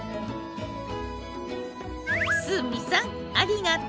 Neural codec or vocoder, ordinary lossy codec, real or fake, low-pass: none; none; real; none